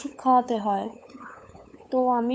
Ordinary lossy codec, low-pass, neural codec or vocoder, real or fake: none; none; codec, 16 kHz, 8 kbps, FunCodec, trained on LibriTTS, 25 frames a second; fake